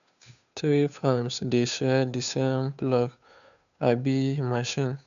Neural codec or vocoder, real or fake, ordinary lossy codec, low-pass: codec, 16 kHz, 2 kbps, FunCodec, trained on Chinese and English, 25 frames a second; fake; none; 7.2 kHz